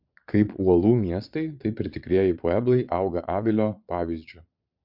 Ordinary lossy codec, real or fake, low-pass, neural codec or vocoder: MP3, 48 kbps; fake; 5.4 kHz; vocoder, 22.05 kHz, 80 mel bands, Vocos